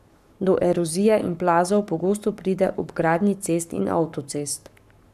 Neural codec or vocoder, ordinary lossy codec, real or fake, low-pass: codec, 44.1 kHz, 7.8 kbps, Pupu-Codec; none; fake; 14.4 kHz